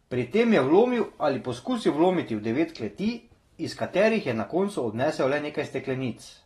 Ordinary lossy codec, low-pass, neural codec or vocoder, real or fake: AAC, 32 kbps; 14.4 kHz; none; real